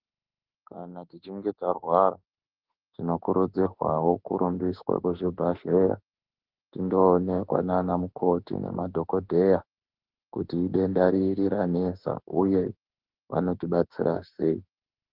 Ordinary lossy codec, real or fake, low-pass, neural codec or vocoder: Opus, 16 kbps; fake; 5.4 kHz; autoencoder, 48 kHz, 32 numbers a frame, DAC-VAE, trained on Japanese speech